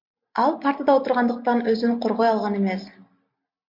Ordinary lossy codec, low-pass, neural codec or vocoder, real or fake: MP3, 48 kbps; 5.4 kHz; none; real